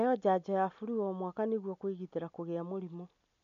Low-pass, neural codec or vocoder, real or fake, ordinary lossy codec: 7.2 kHz; none; real; none